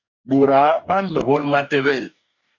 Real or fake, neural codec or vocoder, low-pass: fake; codec, 44.1 kHz, 2.6 kbps, DAC; 7.2 kHz